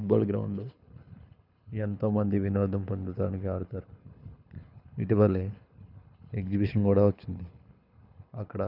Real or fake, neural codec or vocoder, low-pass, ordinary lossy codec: fake; codec, 24 kHz, 6 kbps, HILCodec; 5.4 kHz; none